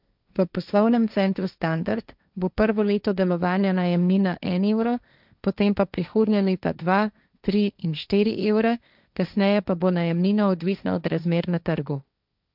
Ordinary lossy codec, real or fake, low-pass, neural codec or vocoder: none; fake; 5.4 kHz; codec, 16 kHz, 1.1 kbps, Voila-Tokenizer